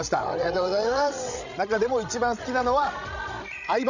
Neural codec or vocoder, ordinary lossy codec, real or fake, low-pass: codec, 16 kHz, 16 kbps, FreqCodec, larger model; none; fake; 7.2 kHz